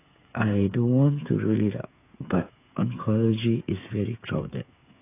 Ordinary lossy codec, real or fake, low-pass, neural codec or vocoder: AAC, 24 kbps; fake; 3.6 kHz; codec, 16 kHz, 8 kbps, FreqCodec, smaller model